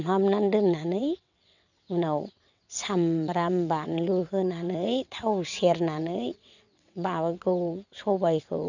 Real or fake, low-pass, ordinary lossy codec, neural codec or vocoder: fake; 7.2 kHz; none; vocoder, 22.05 kHz, 80 mel bands, WaveNeXt